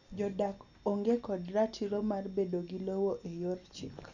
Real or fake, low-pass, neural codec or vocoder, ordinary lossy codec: real; 7.2 kHz; none; none